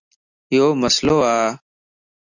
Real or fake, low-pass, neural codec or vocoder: real; 7.2 kHz; none